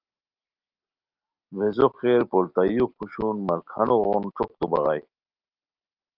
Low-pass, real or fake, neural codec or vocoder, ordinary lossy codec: 5.4 kHz; real; none; Opus, 32 kbps